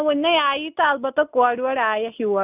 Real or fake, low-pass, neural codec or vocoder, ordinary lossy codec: real; 3.6 kHz; none; none